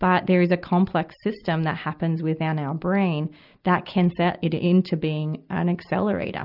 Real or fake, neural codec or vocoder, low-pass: real; none; 5.4 kHz